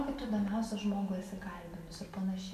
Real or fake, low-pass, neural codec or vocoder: real; 14.4 kHz; none